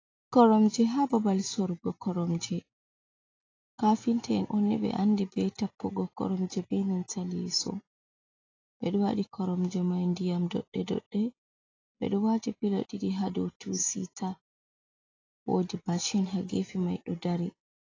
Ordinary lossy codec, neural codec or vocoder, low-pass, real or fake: AAC, 32 kbps; none; 7.2 kHz; real